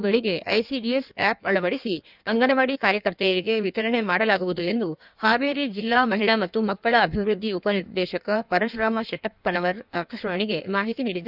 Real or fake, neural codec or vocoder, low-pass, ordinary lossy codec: fake; codec, 16 kHz in and 24 kHz out, 1.1 kbps, FireRedTTS-2 codec; 5.4 kHz; none